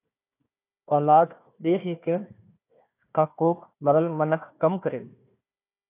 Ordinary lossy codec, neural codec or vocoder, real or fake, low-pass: AAC, 24 kbps; codec, 16 kHz, 1 kbps, FunCodec, trained on Chinese and English, 50 frames a second; fake; 3.6 kHz